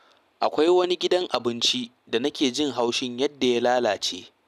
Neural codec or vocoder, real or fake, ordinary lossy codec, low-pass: none; real; none; 14.4 kHz